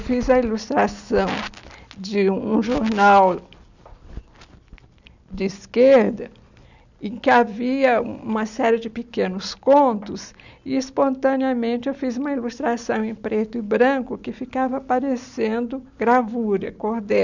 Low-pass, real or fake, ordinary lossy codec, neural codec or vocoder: 7.2 kHz; real; none; none